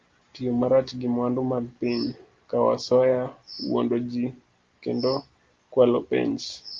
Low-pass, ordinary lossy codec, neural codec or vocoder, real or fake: 7.2 kHz; Opus, 32 kbps; none; real